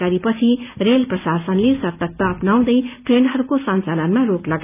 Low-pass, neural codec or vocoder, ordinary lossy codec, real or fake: 3.6 kHz; none; none; real